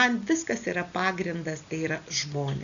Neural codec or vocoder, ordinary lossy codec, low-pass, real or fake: none; MP3, 96 kbps; 7.2 kHz; real